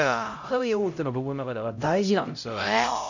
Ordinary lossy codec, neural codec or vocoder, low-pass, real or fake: none; codec, 16 kHz, 0.5 kbps, X-Codec, HuBERT features, trained on LibriSpeech; 7.2 kHz; fake